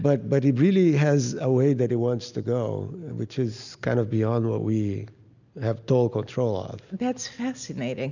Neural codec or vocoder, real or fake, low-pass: none; real; 7.2 kHz